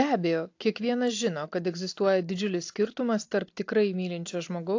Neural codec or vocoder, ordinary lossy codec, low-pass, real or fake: none; AAC, 48 kbps; 7.2 kHz; real